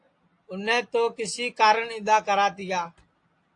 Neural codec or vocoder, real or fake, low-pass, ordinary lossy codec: none; real; 9.9 kHz; AAC, 48 kbps